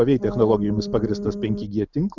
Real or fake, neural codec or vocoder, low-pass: real; none; 7.2 kHz